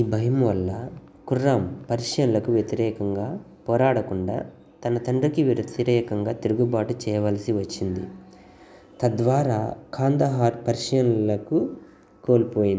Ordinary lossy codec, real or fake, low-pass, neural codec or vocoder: none; real; none; none